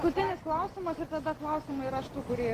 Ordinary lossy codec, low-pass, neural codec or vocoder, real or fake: Opus, 16 kbps; 14.4 kHz; none; real